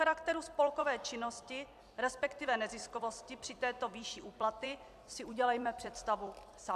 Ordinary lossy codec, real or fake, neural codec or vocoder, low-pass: MP3, 96 kbps; fake; vocoder, 44.1 kHz, 128 mel bands every 256 samples, BigVGAN v2; 14.4 kHz